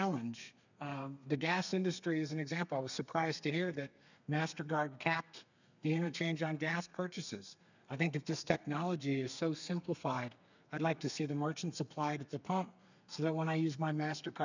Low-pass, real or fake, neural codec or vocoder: 7.2 kHz; fake; codec, 32 kHz, 1.9 kbps, SNAC